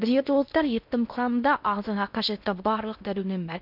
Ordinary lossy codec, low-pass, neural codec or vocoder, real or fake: none; 5.4 kHz; codec, 16 kHz in and 24 kHz out, 0.6 kbps, FocalCodec, streaming, 2048 codes; fake